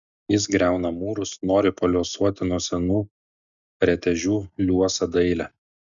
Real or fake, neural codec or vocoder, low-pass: real; none; 7.2 kHz